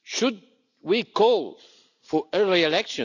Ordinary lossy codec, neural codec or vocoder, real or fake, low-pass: none; none; real; 7.2 kHz